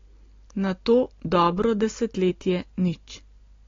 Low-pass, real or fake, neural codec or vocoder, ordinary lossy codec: 7.2 kHz; real; none; AAC, 32 kbps